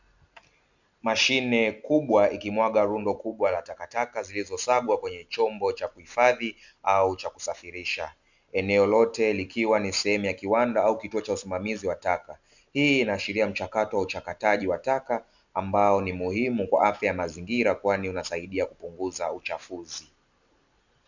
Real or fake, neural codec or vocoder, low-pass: real; none; 7.2 kHz